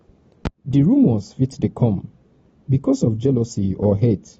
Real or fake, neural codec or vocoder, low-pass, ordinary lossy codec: real; none; 19.8 kHz; AAC, 24 kbps